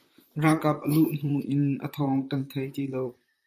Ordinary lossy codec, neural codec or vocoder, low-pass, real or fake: MP3, 64 kbps; vocoder, 44.1 kHz, 128 mel bands, Pupu-Vocoder; 14.4 kHz; fake